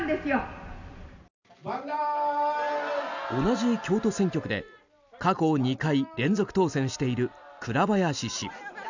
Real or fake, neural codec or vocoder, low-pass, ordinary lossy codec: real; none; 7.2 kHz; none